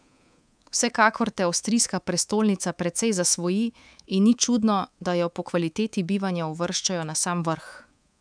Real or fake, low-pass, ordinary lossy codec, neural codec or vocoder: fake; 9.9 kHz; none; codec, 24 kHz, 3.1 kbps, DualCodec